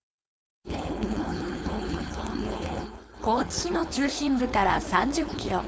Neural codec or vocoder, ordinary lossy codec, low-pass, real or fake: codec, 16 kHz, 4.8 kbps, FACodec; none; none; fake